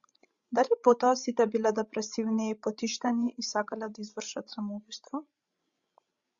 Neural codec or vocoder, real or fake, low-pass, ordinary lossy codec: codec, 16 kHz, 16 kbps, FreqCodec, larger model; fake; 7.2 kHz; Opus, 64 kbps